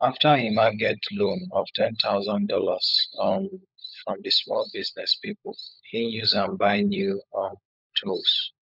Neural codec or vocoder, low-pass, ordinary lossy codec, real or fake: codec, 16 kHz, 16 kbps, FunCodec, trained on LibriTTS, 50 frames a second; 5.4 kHz; none; fake